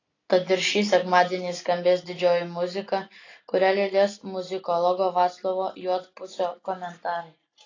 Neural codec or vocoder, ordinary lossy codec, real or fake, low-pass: none; AAC, 32 kbps; real; 7.2 kHz